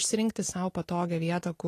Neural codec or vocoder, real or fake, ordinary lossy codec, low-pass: none; real; AAC, 48 kbps; 14.4 kHz